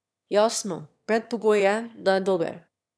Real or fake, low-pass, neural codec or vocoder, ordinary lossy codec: fake; none; autoencoder, 22.05 kHz, a latent of 192 numbers a frame, VITS, trained on one speaker; none